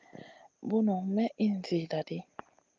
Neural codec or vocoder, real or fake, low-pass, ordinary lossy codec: none; real; 7.2 kHz; Opus, 24 kbps